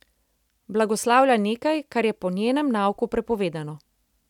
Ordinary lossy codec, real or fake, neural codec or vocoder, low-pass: none; real; none; 19.8 kHz